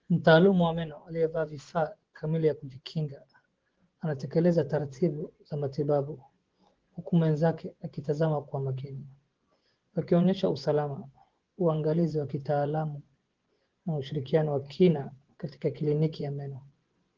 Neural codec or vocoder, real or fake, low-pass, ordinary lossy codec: vocoder, 24 kHz, 100 mel bands, Vocos; fake; 7.2 kHz; Opus, 16 kbps